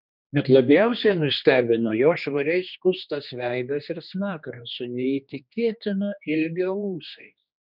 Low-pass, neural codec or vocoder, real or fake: 5.4 kHz; codec, 16 kHz, 2 kbps, X-Codec, HuBERT features, trained on general audio; fake